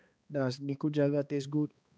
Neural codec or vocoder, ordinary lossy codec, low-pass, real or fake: codec, 16 kHz, 2 kbps, X-Codec, HuBERT features, trained on balanced general audio; none; none; fake